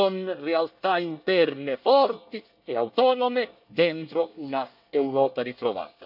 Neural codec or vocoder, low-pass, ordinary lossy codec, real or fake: codec, 24 kHz, 1 kbps, SNAC; 5.4 kHz; AAC, 48 kbps; fake